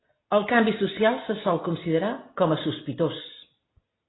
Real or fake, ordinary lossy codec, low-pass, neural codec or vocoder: real; AAC, 16 kbps; 7.2 kHz; none